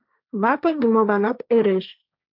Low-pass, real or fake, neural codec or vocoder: 5.4 kHz; fake; codec, 16 kHz, 1.1 kbps, Voila-Tokenizer